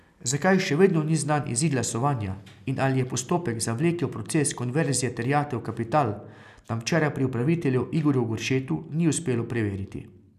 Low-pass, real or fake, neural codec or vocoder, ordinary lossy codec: 14.4 kHz; real; none; none